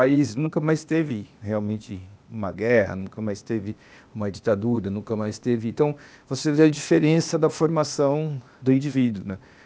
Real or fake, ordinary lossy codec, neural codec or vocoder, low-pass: fake; none; codec, 16 kHz, 0.8 kbps, ZipCodec; none